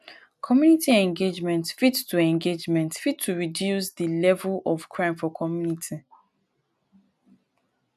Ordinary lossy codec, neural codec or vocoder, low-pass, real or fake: none; none; 14.4 kHz; real